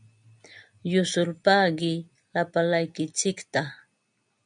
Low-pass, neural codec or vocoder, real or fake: 9.9 kHz; none; real